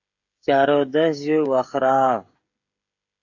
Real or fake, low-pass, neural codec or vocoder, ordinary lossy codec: fake; 7.2 kHz; codec, 16 kHz, 16 kbps, FreqCodec, smaller model; AAC, 48 kbps